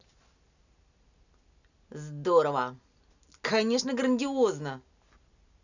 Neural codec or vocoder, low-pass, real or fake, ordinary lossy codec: none; 7.2 kHz; real; none